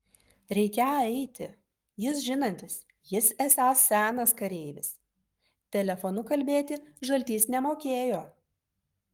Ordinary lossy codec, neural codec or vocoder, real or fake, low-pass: Opus, 32 kbps; codec, 44.1 kHz, 7.8 kbps, Pupu-Codec; fake; 19.8 kHz